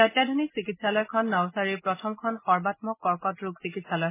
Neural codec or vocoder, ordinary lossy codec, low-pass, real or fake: none; MP3, 16 kbps; 3.6 kHz; real